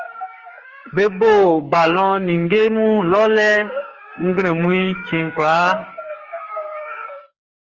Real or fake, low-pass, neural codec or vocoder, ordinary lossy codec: fake; 7.2 kHz; codec, 44.1 kHz, 2.6 kbps, SNAC; Opus, 24 kbps